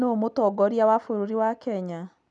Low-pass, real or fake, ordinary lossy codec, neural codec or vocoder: 9.9 kHz; real; none; none